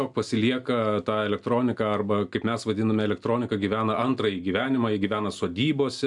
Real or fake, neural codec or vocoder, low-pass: real; none; 10.8 kHz